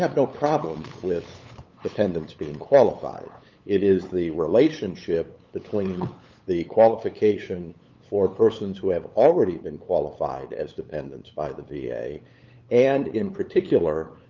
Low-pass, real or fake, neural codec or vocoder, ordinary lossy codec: 7.2 kHz; fake; codec, 16 kHz, 8 kbps, FunCodec, trained on LibriTTS, 25 frames a second; Opus, 24 kbps